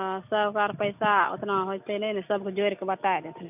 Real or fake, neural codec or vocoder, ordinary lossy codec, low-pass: real; none; none; 3.6 kHz